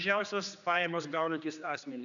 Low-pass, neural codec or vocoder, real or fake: 7.2 kHz; codec, 16 kHz, 2 kbps, X-Codec, HuBERT features, trained on general audio; fake